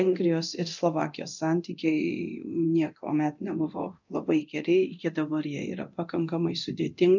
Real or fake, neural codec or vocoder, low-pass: fake; codec, 24 kHz, 0.9 kbps, DualCodec; 7.2 kHz